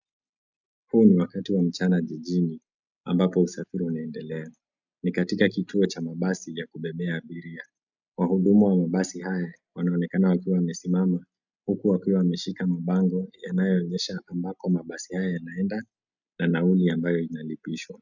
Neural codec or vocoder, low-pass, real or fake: none; 7.2 kHz; real